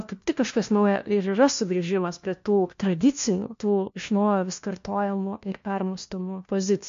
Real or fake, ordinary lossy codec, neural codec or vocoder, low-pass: fake; MP3, 48 kbps; codec, 16 kHz, 1 kbps, FunCodec, trained on LibriTTS, 50 frames a second; 7.2 kHz